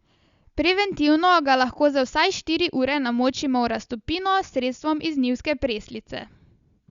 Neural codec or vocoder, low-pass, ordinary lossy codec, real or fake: none; 7.2 kHz; Opus, 64 kbps; real